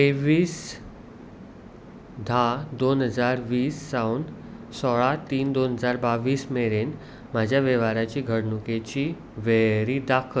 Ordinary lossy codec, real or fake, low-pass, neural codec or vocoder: none; real; none; none